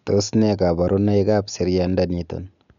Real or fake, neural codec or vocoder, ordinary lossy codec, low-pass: real; none; none; 7.2 kHz